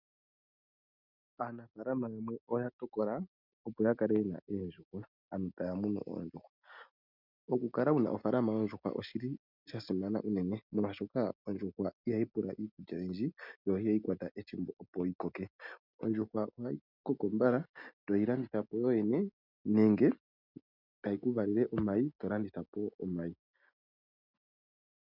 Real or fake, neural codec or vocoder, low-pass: real; none; 5.4 kHz